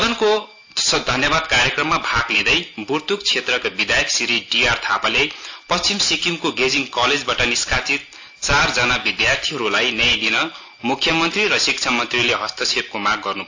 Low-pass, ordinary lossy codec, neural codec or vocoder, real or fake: 7.2 kHz; AAC, 48 kbps; none; real